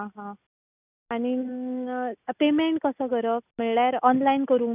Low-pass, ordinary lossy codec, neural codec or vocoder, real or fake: 3.6 kHz; none; none; real